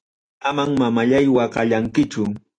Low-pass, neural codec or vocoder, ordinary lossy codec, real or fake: 9.9 kHz; none; AAC, 48 kbps; real